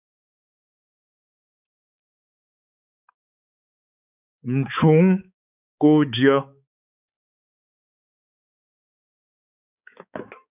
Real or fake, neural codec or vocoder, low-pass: fake; codec, 16 kHz, 4 kbps, X-Codec, HuBERT features, trained on balanced general audio; 3.6 kHz